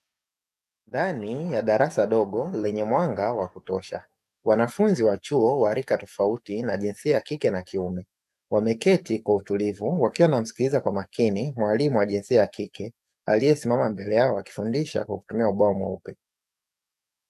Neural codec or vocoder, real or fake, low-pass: codec, 44.1 kHz, 7.8 kbps, DAC; fake; 14.4 kHz